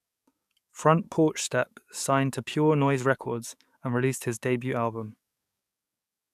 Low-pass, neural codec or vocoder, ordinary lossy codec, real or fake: 14.4 kHz; codec, 44.1 kHz, 7.8 kbps, DAC; none; fake